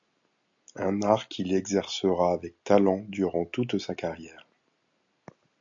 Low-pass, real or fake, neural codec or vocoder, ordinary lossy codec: 7.2 kHz; real; none; MP3, 64 kbps